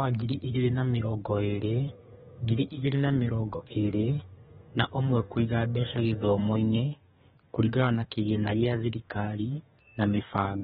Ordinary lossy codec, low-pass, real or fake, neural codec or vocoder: AAC, 16 kbps; 14.4 kHz; fake; codec, 32 kHz, 1.9 kbps, SNAC